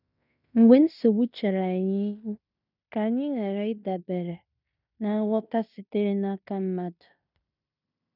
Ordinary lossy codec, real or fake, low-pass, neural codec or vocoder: none; fake; 5.4 kHz; codec, 16 kHz in and 24 kHz out, 0.9 kbps, LongCat-Audio-Codec, fine tuned four codebook decoder